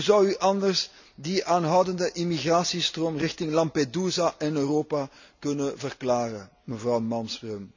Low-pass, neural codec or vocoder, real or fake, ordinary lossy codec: 7.2 kHz; none; real; none